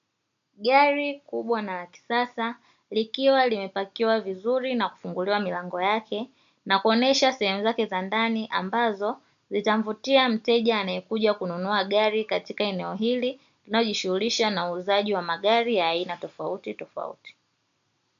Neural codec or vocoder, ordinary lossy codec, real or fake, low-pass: none; MP3, 64 kbps; real; 7.2 kHz